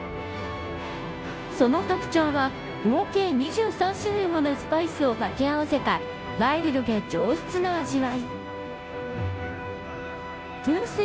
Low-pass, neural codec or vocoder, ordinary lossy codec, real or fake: none; codec, 16 kHz, 0.5 kbps, FunCodec, trained on Chinese and English, 25 frames a second; none; fake